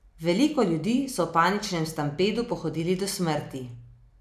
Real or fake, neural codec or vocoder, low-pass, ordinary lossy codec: real; none; 14.4 kHz; none